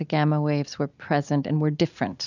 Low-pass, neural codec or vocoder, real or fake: 7.2 kHz; none; real